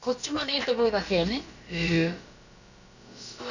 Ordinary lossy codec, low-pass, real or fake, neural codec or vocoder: none; 7.2 kHz; fake; codec, 16 kHz, about 1 kbps, DyCAST, with the encoder's durations